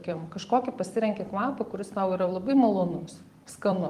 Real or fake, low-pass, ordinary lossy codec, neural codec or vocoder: fake; 14.4 kHz; Opus, 24 kbps; vocoder, 44.1 kHz, 128 mel bands every 256 samples, BigVGAN v2